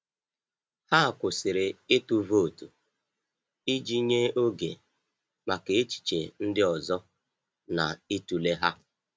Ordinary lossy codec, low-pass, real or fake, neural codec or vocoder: none; none; real; none